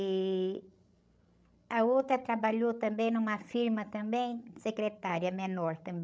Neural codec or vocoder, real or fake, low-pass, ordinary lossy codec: codec, 16 kHz, 16 kbps, FreqCodec, larger model; fake; none; none